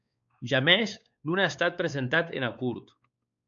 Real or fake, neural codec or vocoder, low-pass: fake; codec, 16 kHz, 4 kbps, X-Codec, WavLM features, trained on Multilingual LibriSpeech; 7.2 kHz